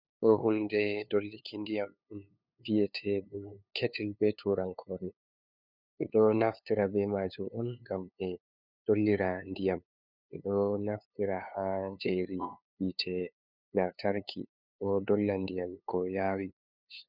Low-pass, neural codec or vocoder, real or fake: 5.4 kHz; codec, 16 kHz, 2 kbps, FunCodec, trained on LibriTTS, 25 frames a second; fake